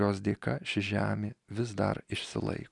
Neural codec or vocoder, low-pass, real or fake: none; 10.8 kHz; real